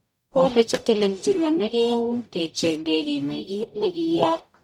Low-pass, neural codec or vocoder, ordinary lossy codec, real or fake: 19.8 kHz; codec, 44.1 kHz, 0.9 kbps, DAC; none; fake